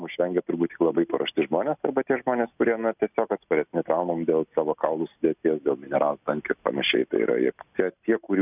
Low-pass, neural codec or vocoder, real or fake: 3.6 kHz; none; real